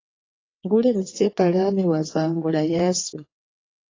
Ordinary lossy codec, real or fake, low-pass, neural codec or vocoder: AAC, 32 kbps; fake; 7.2 kHz; vocoder, 22.05 kHz, 80 mel bands, WaveNeXt